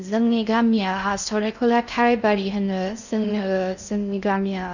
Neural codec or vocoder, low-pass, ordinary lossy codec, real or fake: codec, 16 kHz in and 24 kHz out, 0.6 kbps, FocalCodec, streaming, 4096 codes; 7.2 kHz; none; fake